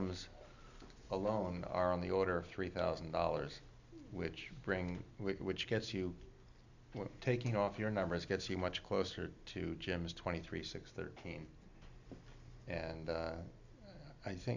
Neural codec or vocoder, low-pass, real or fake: none; 7.2 kHz; real